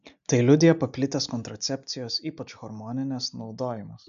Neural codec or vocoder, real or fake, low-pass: none; real; 7.2 kHz